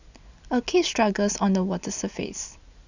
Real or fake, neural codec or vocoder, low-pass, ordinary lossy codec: real; none; 7.2 kHz; none